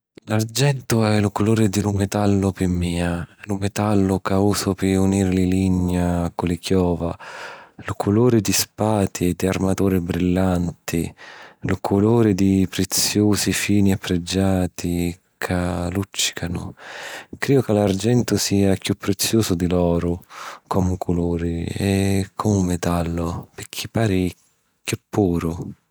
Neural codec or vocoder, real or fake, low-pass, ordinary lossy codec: none; real; none; none